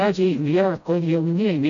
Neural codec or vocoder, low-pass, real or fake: codec, 16 kHz, 0.5 kbps, FreqCodec, smaller model; 7.2 kHz; fake